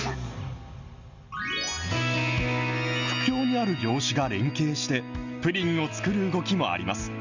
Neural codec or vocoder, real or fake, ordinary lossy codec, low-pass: none; real; Opus, 64 kbps; 7.2 kHz